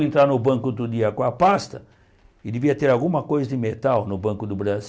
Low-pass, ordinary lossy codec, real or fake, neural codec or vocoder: none; none; real; none